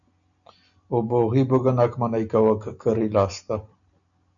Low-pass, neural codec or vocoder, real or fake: 7.2 kHz; none; real